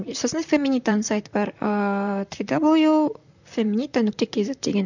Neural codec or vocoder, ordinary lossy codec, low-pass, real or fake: vocoder, 44.1 kHz, 128 mel bands, Pupu-Vocoder; none; 7.2 kHz; fake